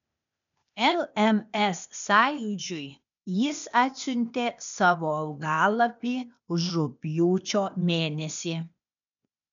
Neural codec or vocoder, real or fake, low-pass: codec, 16 kHz, 0.8 kbps, ZipCodec; fake; 7.2 kHz